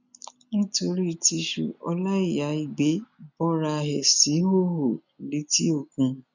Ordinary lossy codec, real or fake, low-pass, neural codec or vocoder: none; real; 7.2 kHz; none